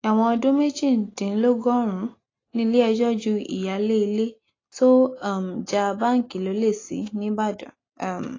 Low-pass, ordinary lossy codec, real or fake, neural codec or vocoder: 7.2 kHz; AAC, 32 kbps; real; none